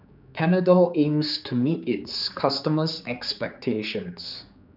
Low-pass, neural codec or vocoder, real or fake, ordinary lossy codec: 5.4 kHz; codec, 16 kHz, 4 kbps, X-Codec, HuBERT features, trained on general audio; fake; none